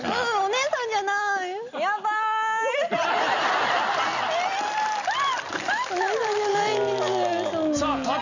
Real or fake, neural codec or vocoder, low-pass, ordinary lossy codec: real; none; 7.2 kHz; none